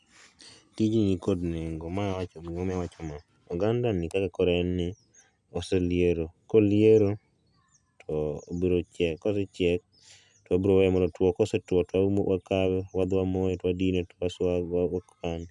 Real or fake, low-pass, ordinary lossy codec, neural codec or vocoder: real; 10.8 kHz; none; none